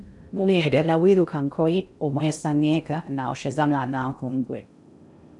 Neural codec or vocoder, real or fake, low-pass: codec, 16 kHz in and 24 kHz out, 0.6 kbps, FocalCodec, streaming, 2048 codes; fake; 10.8 kHz